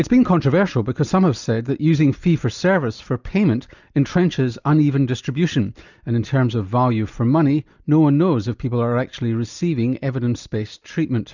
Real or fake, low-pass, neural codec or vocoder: real; 7.2 kHz; none